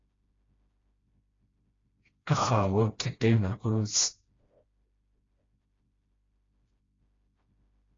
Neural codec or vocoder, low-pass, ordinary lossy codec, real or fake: codec, 16 kHz, 1 kbps, FreqCodec, smaller model; 7.2 kHz; AAC, 32 kbps; fake